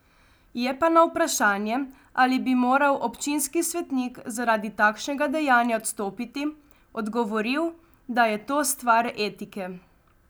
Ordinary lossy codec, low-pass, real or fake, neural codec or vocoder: none; none; real; none